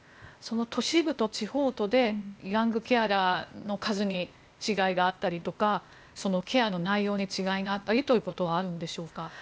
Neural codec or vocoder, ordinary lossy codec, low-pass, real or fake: codec, 16 kHz, 0.8 kbps, ZipCodec; none; none; fake